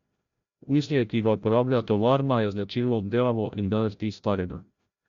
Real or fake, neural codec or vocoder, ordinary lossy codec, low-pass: fake; codec, 16 kHz, 0.5 kbps, FreqCodec, larger model; Opus, 64 kbps; 7.2 kHz